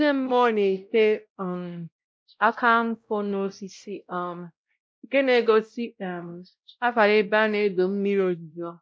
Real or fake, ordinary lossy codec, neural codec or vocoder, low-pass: fake; none; codec, 16 kHz, 0.5 kbps, X-Codec, WavLM features, trained on Multilingual LibriSpeech; none